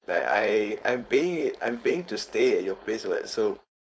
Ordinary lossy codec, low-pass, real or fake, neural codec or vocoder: none; none; fake; codec, 16 kHz, 4.8 kbps, FACodec